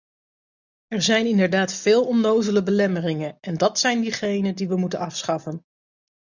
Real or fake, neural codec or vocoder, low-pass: real; none; 7.2 kHz